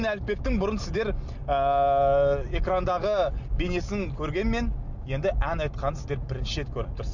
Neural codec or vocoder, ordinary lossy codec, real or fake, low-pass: none; none; real; 7.2 kHz